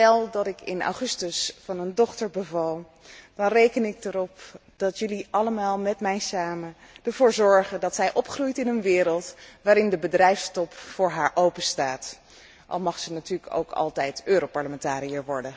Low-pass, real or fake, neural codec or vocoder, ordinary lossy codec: none; real; none; none